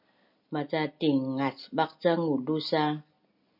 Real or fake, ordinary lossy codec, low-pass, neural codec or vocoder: real; MP3, 48 kbps; 5.4 kHz; none